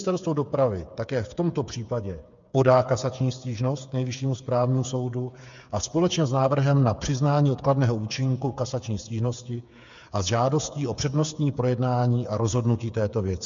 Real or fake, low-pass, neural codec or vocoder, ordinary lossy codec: fake; 7.2 kHz; codec, 16 kHz, 8 kbps, FreqCodec, smaller model; MP3, 64 kbps